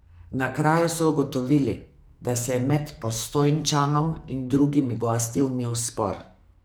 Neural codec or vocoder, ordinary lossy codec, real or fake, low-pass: codec, 44.1 kHz, 2.6 kbps, SNAC; none; fake; none